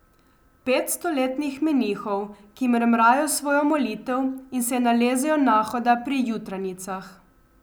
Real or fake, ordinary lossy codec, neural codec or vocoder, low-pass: real; none; none; none